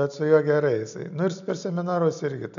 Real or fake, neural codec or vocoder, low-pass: real; none; 7.2 kHz